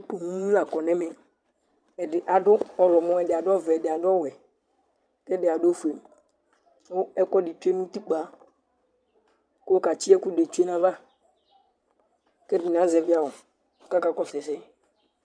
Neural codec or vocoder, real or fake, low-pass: vocoder, 22.05 kHz, 80 mel bands, Vocos; fake; 9.9 kHz